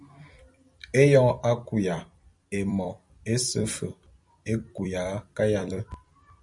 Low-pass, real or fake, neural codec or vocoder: 10.8 kHz; fake; vocoder, 44.1 kHz, 128 mel bands every 256 samples, BigVGAN v2